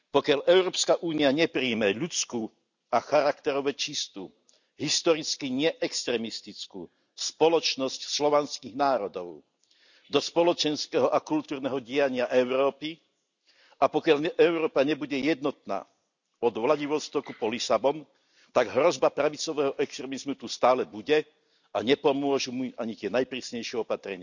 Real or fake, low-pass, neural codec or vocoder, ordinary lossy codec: real; 7.2 kHz; none; none